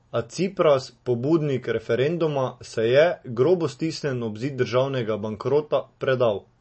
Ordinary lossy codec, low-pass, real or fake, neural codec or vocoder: MP3, 32 kbps; 10.8 kHz; real; none